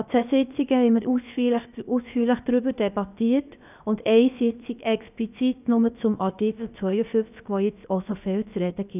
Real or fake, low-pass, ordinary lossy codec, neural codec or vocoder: fake; 3.6 kHz; none; codec, 16 kHz, about 1 kbps, DyCAST, with the encoder's durations